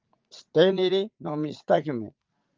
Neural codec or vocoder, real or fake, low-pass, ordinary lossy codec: vocoder, 44.1 kHz, 80 mel bands, Vocos; fake; 7.2 kHz; Opus, 24 kbps